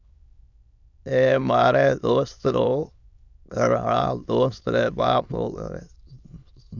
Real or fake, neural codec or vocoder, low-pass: fake; autoencoder, 22.05 kHz, a latent of 192 numbers a frame, VITS, trained on many speakers; 7.2 kHz